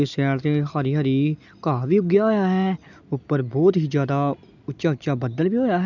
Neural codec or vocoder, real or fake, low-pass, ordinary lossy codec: none; real; 7.2 kHz; none